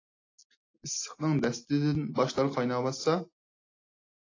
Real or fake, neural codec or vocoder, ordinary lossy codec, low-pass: real; none; AAC, 32 kbps; 7.2 kHz